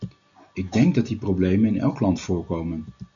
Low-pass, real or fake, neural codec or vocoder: 7.2 kHz; real; none